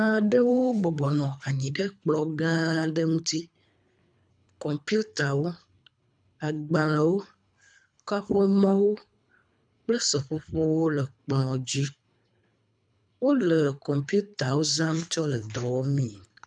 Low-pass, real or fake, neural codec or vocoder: 9.9 kHz; fake; codec, 24 kHz, 3 kbps, HILCodec